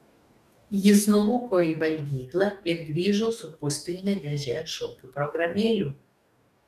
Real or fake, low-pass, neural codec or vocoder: fake; 14.4 kHz; codec, 44.1 kHz, 2.6 kbps, DAC